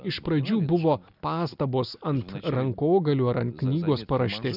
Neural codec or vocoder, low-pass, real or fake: none; 5.4 kHz; real